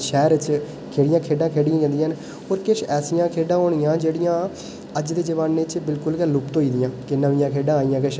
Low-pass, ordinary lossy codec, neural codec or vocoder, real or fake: none; none; none; real